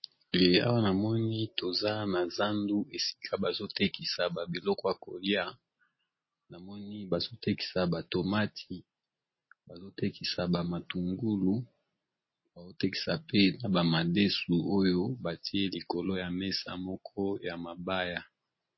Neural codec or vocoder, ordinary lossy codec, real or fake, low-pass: none; MP3, 24 kbps; real; 7.2 kHz